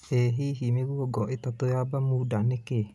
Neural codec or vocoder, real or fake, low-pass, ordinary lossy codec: none; real; none; none